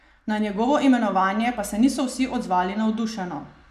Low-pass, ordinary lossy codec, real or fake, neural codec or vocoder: 14.4 kHz; none; real; none